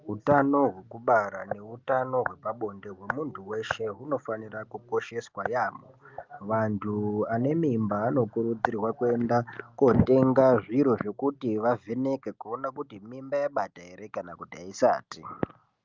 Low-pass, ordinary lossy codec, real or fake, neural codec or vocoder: 7.2 kHz; Opus, 32 kbps; real; none